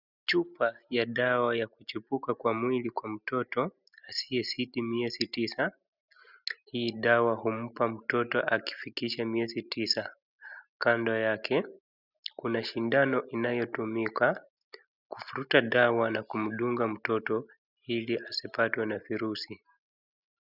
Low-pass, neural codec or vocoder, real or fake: 5.4 kHz; none; real